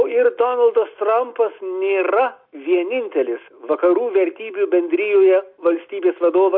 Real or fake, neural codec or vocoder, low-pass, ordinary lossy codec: real; none; 5.4 kHz; MP3, 48 kbps